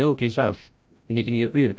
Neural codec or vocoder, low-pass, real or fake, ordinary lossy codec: codec, 16 kHz, 0.5 kbps, FreqCodec, larger model; none; fake; none